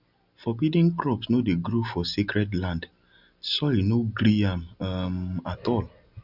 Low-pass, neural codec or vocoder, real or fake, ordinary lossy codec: 5.4 kHz; none; real; none